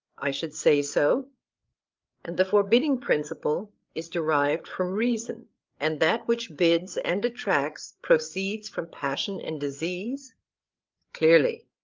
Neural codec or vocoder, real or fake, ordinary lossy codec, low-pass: codec, 16 kHz, 8 kbps, FreqCodec, larger model; fake; Opus, 32 kbps; 7.2 kHz